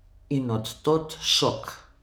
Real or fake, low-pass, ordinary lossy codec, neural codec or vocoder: fake; none; none; codec, 44.1 kHz, 7.8 kbps, DAC